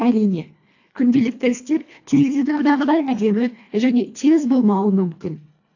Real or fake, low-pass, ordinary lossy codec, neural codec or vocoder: fake; 7.2 kHz; AAC, 48 kbps; codec, 24 kHz, 1.5 kbps, HILCodec